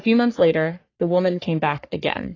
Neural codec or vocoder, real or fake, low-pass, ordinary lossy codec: codec, 44.1 kHz, 3.4 kbps, Pupu-Codec; fake; 7.2 kHz; AAC, 32 kbps